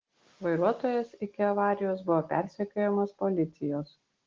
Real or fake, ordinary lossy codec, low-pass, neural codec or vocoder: real; Opus, 16 kbps; 7.2 kHz; none